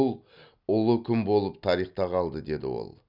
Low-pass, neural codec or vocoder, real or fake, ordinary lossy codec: 5.4 kHz; none; real; none